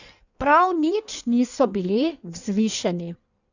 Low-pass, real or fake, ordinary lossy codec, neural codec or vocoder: 7.2 kHz; fake; none; codec, 16 kHz in and 24 kHz out, 1.1 kbps, FireRedTTS-2 codec